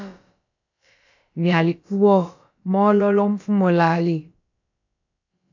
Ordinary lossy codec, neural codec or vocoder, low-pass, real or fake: AAC, 48 kbps; codec, 16 kHz, about 1 kbps, DyCAST, with the encoder's durations; 7.2 kHz; fake